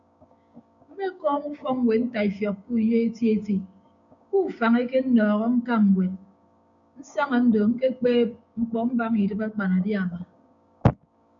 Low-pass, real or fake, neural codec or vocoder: 7.2 kHz; fake; codec, 16 kHz, 6 kbps, DAC